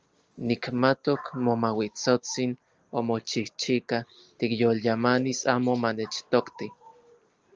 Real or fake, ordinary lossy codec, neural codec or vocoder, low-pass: real; Opus, 24 kbps; none; 7.2 kHz